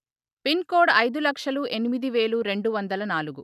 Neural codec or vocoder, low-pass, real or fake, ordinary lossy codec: none; 14.4 kHz; real; none